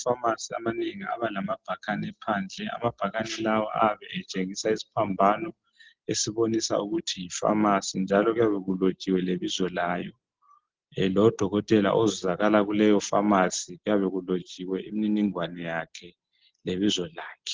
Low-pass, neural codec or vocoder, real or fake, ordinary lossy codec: 7.2 kHz; none; real; Opus, 16 kbps